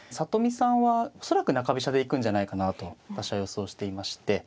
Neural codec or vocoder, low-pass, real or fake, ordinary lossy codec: none; none; real; none